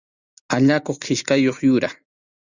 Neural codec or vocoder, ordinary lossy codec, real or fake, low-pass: none; Opus, 64 kbps; real; 7.2 kHz